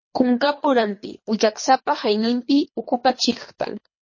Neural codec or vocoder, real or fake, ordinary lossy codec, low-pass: codec, 24 kHz, 3 kbps, HILCodec; fake; MP3, 32 kbps; 7.2 kHz